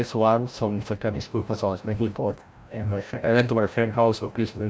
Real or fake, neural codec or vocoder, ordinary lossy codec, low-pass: fake; codec, 16 kHz, 0.5 kbps, FreqCodec, larger model; none; none